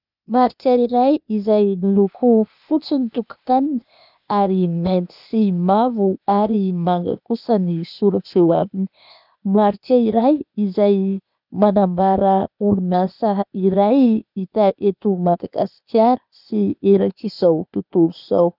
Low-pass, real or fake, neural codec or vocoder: 5.4 kHz; fake; codec, 16 kHz, 0.8 kbps, ZipCodec